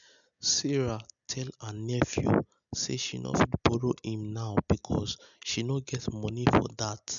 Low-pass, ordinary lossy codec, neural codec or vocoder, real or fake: 7.2 kHz; MP3, 96 kbps; none; real